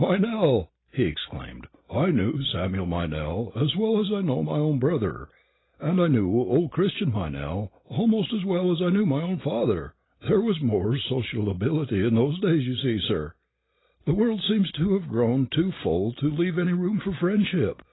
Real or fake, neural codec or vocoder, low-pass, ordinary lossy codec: real; none; 7.2 kHz; AAC, 16 kbps